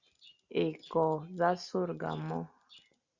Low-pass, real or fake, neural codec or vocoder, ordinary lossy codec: 7.2 kHz; real; none; Opus, 64 kbps